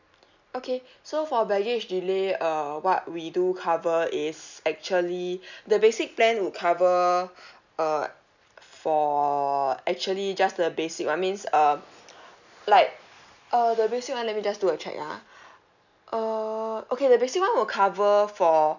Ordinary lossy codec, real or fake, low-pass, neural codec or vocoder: none; real; 7.2 kHz; none